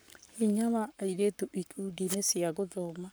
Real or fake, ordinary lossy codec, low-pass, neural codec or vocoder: fake; none; none; codec, 44.1 kHz, 7.8 kbps, Pupu-Codec